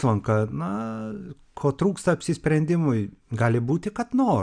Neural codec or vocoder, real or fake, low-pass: none; real; 9.9 kHz